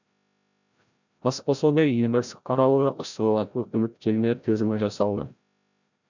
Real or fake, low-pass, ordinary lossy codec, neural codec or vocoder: fake; 7.2 kHz; none; codec, 16 kHz, 0.5 kbps, FreqCodec, larger model